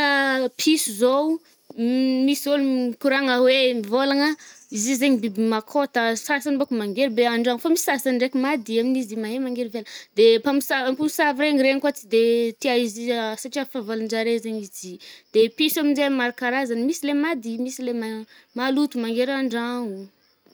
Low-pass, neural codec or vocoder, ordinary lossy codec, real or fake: none; none; none; real